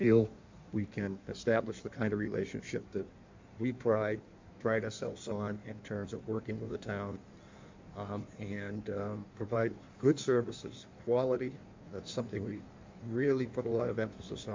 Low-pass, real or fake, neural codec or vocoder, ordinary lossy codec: 7.2 kHz; fake; codec, 16 kHz in and 24 kHz out, 1.1 kbps, FireRedTTS-2 codec; MP3, 64 kbps